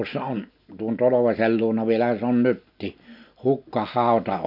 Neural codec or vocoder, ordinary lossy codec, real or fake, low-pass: none; none; real; 5.4 kHz